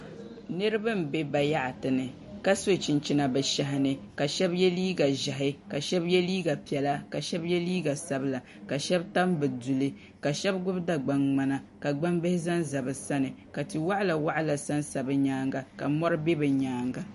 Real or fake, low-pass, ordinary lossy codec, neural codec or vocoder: real; 14.4 kHz; MP3, 48 kbps; none